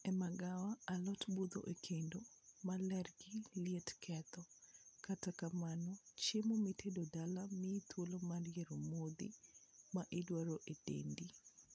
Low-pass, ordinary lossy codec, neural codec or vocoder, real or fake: none; none; none; real